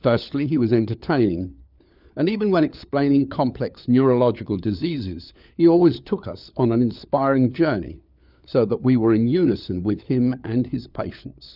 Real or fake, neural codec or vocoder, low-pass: fake; codec, 16 kHz, 4 kbps, FunCodec, trained on LibriTTS, 50 frames a second; 5.4 kHz